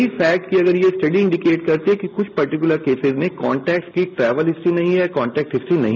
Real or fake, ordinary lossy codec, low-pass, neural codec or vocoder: real; none; 7.2 kHz; none